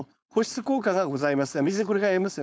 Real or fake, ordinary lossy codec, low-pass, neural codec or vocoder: fake; none; none; codec, 16 kHz, 4.8 kbps, FACodec